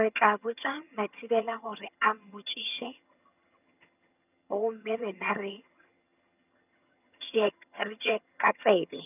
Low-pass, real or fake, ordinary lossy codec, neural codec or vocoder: 3.6 kHz; fake; none; vocoder, 22.05 kHz, 80 mel bands, HiFi-GAN